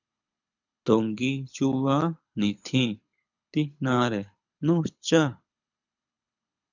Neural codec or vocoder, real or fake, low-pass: codec, 24 kHz, 6 kbps, HILCodec; fake; 7.2 kHz